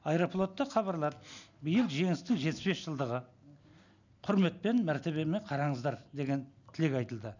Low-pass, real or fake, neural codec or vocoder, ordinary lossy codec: 7.2 kHz; real; none; none